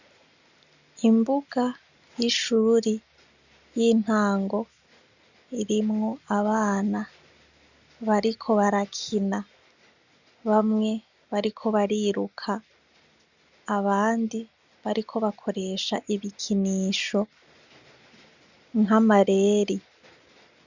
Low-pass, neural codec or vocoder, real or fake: 7.2 kHz; none; real